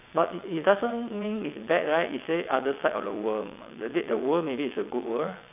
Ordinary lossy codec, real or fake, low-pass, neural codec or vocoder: AAC, 32 kbps; fake; 3.6 kHz; vocoder, 22.05 kHz, 80 mel bands, WaveNeXt